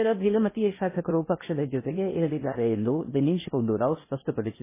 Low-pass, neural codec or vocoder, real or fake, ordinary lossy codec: 3.6 kHz; codec, 16 kHz in and 24 kHz out, 0.6 kbps, FocalCodec, streaming, 2048 codes; fake; MP3, 16 kbps